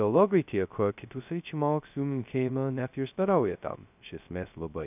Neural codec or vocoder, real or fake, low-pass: codec, 16 kHz, 0.2 kbps, FocalCodec; fake; 3.6 kHz